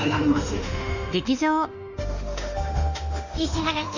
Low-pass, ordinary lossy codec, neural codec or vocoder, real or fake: 7.2 kHz; none; autoencoder, 48 kHz, 32 numbers a frame, DAC-VAE, trained on Japanese speech; fake